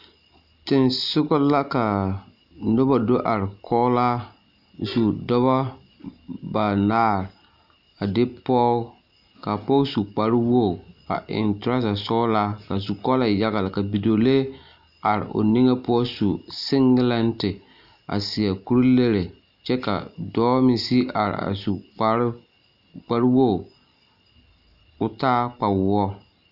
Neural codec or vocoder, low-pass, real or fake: none; 5.4 kHz; real